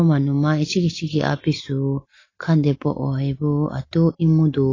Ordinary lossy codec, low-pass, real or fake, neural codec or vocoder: AAC, 32 kbps; 7.2 kHz; real; none